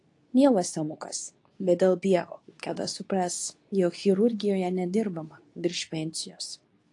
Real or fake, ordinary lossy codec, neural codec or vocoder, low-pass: fake; AAC, 48 kbps; codec, 24 kHz, 0.9 kbps, WavTokenizer, medium speech release version 2; 10.8 kHz